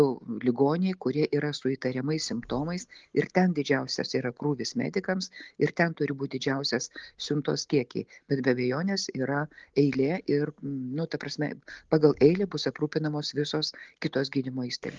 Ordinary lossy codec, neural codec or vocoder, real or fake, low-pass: Opus, 32 kbps; none; real; 7.2 kHz